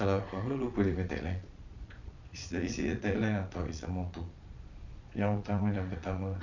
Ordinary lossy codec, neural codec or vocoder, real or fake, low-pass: none; vocoder, 22.05 kHz, 80 mel bands, Vocos; fake; 7.2 kHz